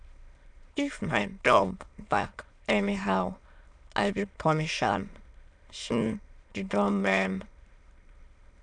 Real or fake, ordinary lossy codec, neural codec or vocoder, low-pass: fake; none; autoencoder, 22.05 kHz, a latent of 192 numbers a frame, VITS, trained on many speakers; 9.9 kHz